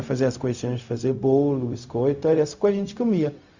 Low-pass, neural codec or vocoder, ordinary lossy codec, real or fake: 7.2 kHz; codec, 16 kHz, 0.4 kbps, LongCat-Audio-Codec; Opus, 64 kbps; fake